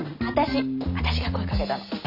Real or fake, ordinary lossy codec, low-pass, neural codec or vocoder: real; none; 5.4 kHz; none